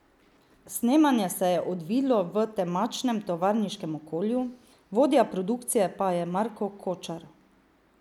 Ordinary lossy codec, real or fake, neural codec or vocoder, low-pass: none; real; none; 19.8 kHz